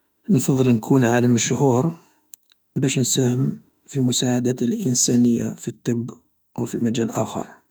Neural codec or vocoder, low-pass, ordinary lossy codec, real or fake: autoencoder, 48 kHz, 32 numbers a frame, DAC-VAE, trained on Japanese speech; none; none; fake